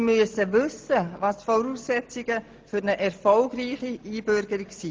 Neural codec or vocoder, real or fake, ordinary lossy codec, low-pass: none; real; Opus, 16 kbps; 7.2 kHz